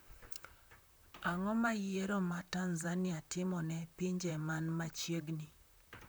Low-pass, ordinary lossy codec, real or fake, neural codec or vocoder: none; none; fake; vocoder, 44.1 kHz, 128 mel bands, Pupu-Vocoder